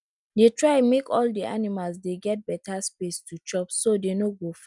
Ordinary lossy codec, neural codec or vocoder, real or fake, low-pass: none; none; real; 10.8 kHz